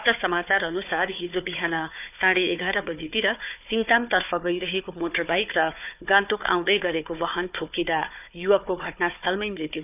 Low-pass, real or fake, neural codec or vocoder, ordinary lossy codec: 3.6 kHz; fake; codec, 16 kHz, 4 kbps, FunCodec, trained on Chinese and English, 50 frames a second; none